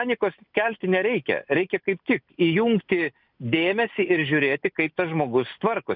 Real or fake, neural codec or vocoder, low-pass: real; none; 5.4 kHz